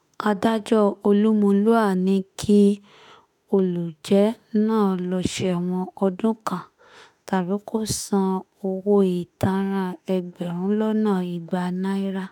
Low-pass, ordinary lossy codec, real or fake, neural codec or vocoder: 19.8 kHz; none; fake; autoencoder, 48 kHz, 32 numbers a frame, DAC-VAE, trained on Japanese speech